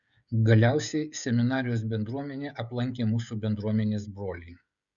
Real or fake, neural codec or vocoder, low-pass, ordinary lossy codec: fake; codec, 16 kHz, 16 kbps, FreqCodec, smaller model; 7.2 kHz; Opus, 64 kbps